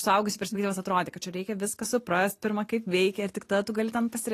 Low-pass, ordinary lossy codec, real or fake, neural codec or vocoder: 14.4 kHz; AAC, 48 kbps; real; none